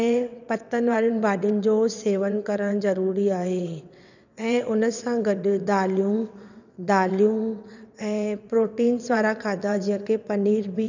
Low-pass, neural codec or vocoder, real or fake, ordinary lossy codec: 7.2 kHz; vocoder, 22.05 kHz, 80 mel bands, WaveNeXt; fake; none